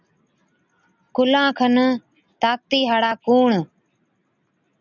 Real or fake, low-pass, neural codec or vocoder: real; 7.2 kHz; none